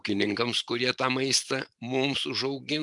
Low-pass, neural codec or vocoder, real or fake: 10.8 kHz; vocoder, 44.1 kHz, 128 mel bands every 512 samples, BigVGAN v2; fake